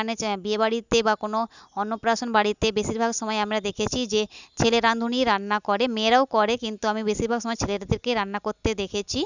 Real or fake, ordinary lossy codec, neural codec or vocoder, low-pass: real; none; none; 7.2 kHz